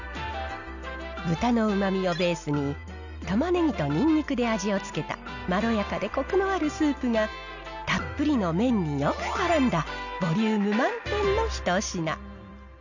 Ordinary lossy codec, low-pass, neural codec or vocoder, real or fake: none; 7.2 kHz; none; real